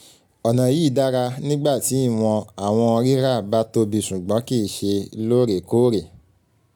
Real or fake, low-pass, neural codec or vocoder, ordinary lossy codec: real; none; none; none